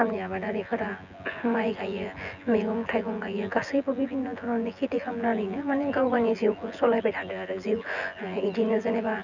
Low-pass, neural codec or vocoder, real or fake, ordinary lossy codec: 7.2 kHz; vocoder, 24 kHz, 100 mel bands, Vocos; fake; none